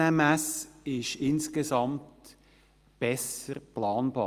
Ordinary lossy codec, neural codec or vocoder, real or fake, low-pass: Opus, 32 kbps; none; real; 14.4 kHz